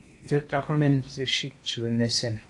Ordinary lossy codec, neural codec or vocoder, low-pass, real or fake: AAC, 64 kbps; codec, 16 kHz in and 24 kHz out, 0.8 kbps, FocalCodec, streaming, 65536 codes; 10.8 kHz; fake